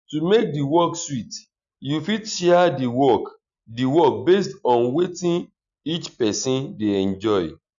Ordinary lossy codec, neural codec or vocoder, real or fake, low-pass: none; none; real; 7.2 kHz